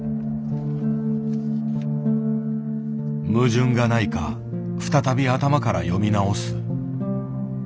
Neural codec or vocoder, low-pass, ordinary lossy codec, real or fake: none; none; none; real